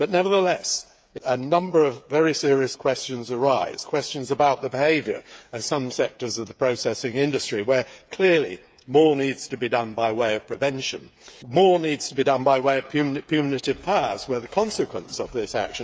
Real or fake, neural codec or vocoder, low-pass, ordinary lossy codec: fake; codec, 16 kHz, 8 kbps, FreqCodec, smaller model; none; none